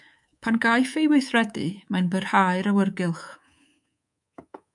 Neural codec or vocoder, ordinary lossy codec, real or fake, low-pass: codec, 24 kHz, 3.1 kbps, DualCodec; MP3, 96 kbps; fake; 10.8 kHz